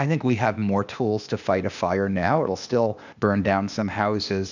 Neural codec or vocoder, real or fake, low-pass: codec, 16 kHz, 0.8 kbps, ZipCodec; fake; 7.2 kHz